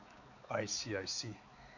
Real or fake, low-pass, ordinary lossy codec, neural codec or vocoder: fake; 7.2 kHz; none; codec, 16 kHz, 4 kbps, X-Codec, HuBERT features, trained on balanced general audio